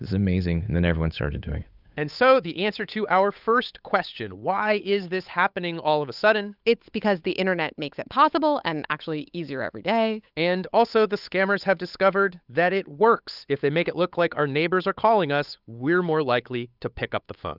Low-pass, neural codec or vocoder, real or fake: 5.4 kHz; codec, 16 kHz, 8 kbps, FunCodec, trained on Chinese and English, 25 frames a second; fake